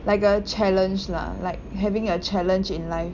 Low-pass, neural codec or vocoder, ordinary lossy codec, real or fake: 7.2 kHz; none; none; real